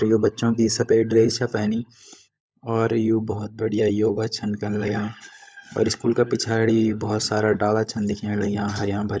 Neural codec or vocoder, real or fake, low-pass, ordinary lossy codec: codec, 16 kHz, 16 kbps, FunCodec, trained on LibriTTS, 50 frames a second; fake; none; none